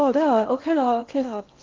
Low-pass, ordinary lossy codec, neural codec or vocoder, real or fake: 7.2 kHz; Opus, 24 kbps; codec, 16 kHz in and 24 kHz out, 0.8 kbps, FocalCodec, streaming, 65536 codes; fake